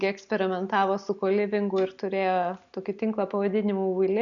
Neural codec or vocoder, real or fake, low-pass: none; real; 7.2 kHz